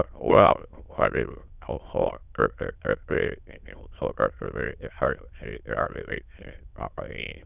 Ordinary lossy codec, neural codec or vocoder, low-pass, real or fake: none; autoencoder, 22.05 kHz, a latent of 192 numbers a frame, VITS, trained on many speakers; 3.6 kHz; fake